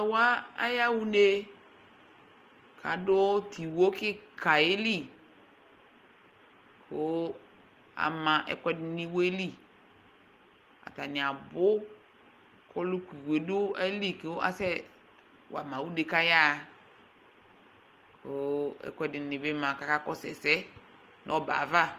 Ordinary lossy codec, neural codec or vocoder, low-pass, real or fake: Opus, 24 kbps; none; 14.4 kHz; real